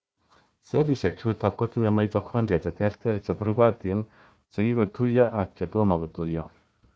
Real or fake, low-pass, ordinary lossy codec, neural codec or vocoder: fake; none; none; codec, 16 kHz, 1 kbps, FunCodec, trained on Chinese and English, 50 frames a second